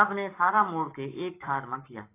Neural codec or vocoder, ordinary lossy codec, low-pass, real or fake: codec, 44.1 kHz, 7.8 kbps, Pupu-Codec; AAC, 24 kbps; 3.6 kHz; fake